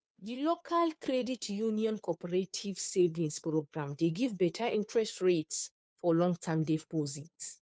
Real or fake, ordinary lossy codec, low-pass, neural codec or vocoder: fake; none; none; codec, 16 kHz, 2 kbps, FunCodec, trained on Chinese and English, 25 frames a second